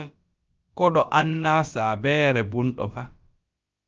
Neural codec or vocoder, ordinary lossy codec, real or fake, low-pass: codec, 16 kHz, about 1 kbps, DyCAST, with the encoder's durations; Opus, 24 kbps; fake; 7.2 kHz